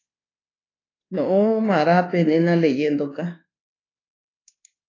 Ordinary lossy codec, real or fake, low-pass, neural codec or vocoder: AAC, 32 kbps; fake; 7.2 kHz; codec, 24 kHz, 1.2 kbps, DualCodec